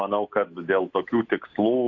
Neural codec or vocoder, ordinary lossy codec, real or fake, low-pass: none; AAC, 48 kbps; real; 7.2 kHz